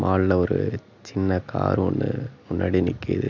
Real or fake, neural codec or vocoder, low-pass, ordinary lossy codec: real; none; 7.2 kHz; none